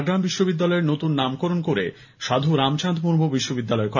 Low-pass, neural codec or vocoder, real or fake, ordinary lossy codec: 7.2 kHz; none; real; none